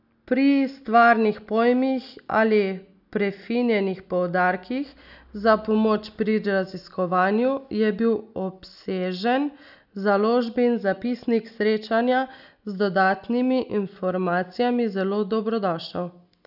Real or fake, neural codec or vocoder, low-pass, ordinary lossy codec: real; none; 5.4 kHz; none